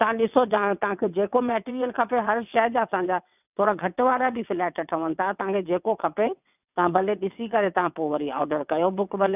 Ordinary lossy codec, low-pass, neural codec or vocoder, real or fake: none; 3.6 kHz; vocoder, 22.05 kHz, 80 mel bands, WaveNeXt; fake